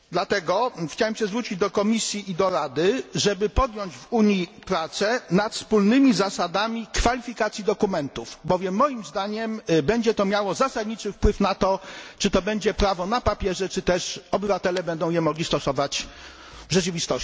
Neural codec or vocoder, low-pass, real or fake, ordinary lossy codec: none; none; real; none